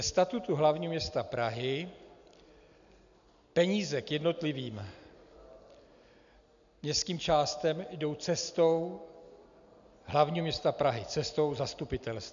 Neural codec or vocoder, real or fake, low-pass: none; real; 7.2 kHz